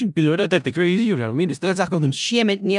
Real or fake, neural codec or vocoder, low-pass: fake; codec, 16 kHz in and 24 kHz out, 0.4 kbps, LongCat-Audio-Codec, four codebook decoder; 10.8 kHz